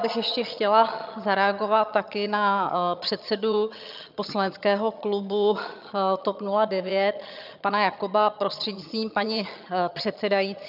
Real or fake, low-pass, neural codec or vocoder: fake; 5.4 kHz; vocoder, 22.05 kHz, 80 mel bands, HiFi-GAN